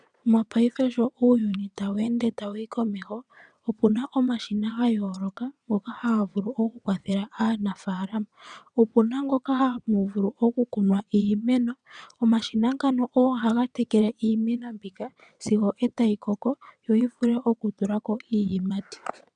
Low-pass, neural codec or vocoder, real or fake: 9.9 kHz; vocoder, 22.05 kHz, 80 mel bands, WaveNeXt; fake